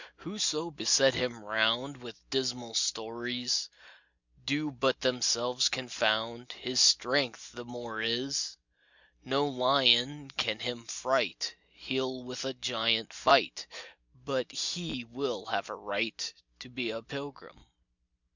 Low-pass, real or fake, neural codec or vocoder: 7.2 kHz; real; none